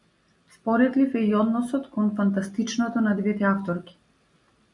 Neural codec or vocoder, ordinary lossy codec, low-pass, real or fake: none; MP3, 64 kbps; 10.8 kHz; real